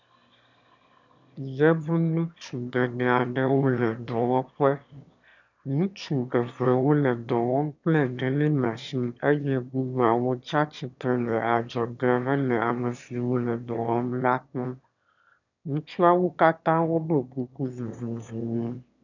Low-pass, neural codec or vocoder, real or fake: 7.2 kHz; autoencoder, 22.05 kHz, a latent of 192 numbers a frame, VITS, trained on one speaker; fake